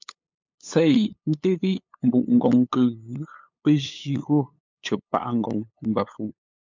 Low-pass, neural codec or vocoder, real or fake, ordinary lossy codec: 7.2 kHz; codec, 16 kHz, 8 kbps, FunCodec, trained on LibriTTS, 25 frames a second; fake; MP3, 64 kbps